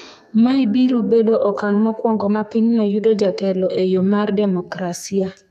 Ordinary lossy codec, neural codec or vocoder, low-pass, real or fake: none; codec, 32 kHz, 1.9 kbps, SNAC; 14.4 kHz; fake